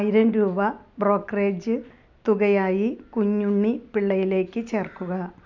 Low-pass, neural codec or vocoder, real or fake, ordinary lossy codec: 7.2 kHz; none; real; none